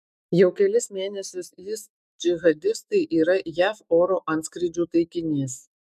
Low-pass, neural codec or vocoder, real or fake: 14.4 kHz; autoencoder, 48 kHz, 128 numbers a frame, DAC-VAE, trained on Japanese speech; fake